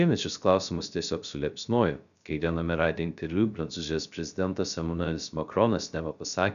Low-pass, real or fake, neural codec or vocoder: 7.2 kHz; fake; codec, 16 kHz, 0.3 kbps, FocalCodec